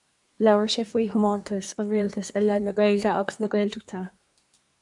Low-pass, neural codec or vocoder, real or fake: 10.8 kHz; codec, 24 kHz, 1 kbps, SNAC; fake